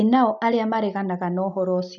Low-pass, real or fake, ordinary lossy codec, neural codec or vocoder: 7.2 kHz; real; none; none